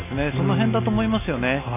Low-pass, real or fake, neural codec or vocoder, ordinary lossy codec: 3.6 kHz; real; none; none